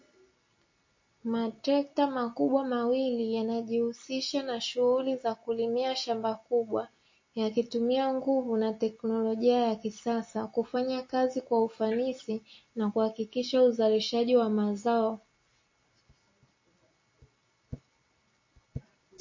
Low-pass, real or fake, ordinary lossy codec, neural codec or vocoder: 7.2 kHz; real; MP3, 32 kbps; none